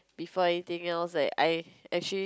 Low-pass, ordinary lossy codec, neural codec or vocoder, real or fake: none; none; none; real